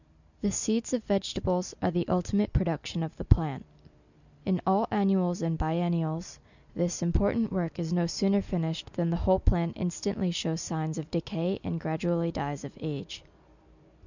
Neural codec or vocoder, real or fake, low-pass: none; real; 7.2 kHz